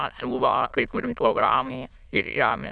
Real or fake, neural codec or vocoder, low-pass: fake; autoencoder, 22.05 kHz, a latent of 192 numbers a frame, VITS, trained on many speakers; 9.9 kHz